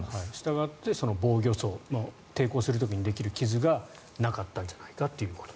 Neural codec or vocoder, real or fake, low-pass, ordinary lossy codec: none; real; none; none